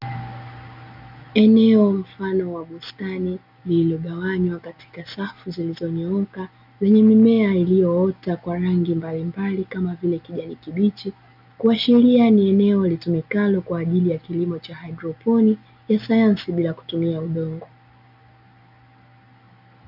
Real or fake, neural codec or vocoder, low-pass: real; none; 5.4 kHz